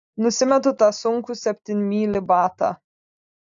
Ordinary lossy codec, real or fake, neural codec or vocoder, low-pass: AAC, 64 kbps; real; none; 7.2 kHz